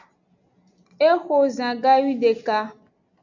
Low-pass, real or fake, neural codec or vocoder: 7.2 kHz; real; none